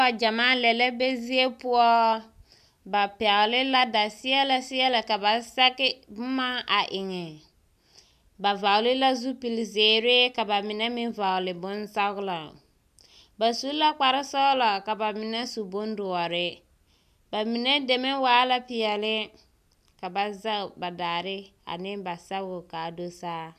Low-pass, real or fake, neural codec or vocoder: 14.4 kHz; real; none